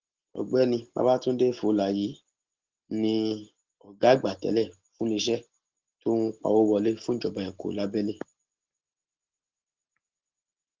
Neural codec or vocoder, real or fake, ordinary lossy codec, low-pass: none; real; Opus, 16 kbps; 7.2 kHz